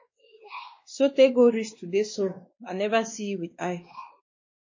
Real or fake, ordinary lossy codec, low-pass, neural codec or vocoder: fake; MP3, 32 kbps; 7.2 kHz; codec, 16 kHz, 2 kbps, X-Codec, WavLM features, trained on Multilingual LibriSpeech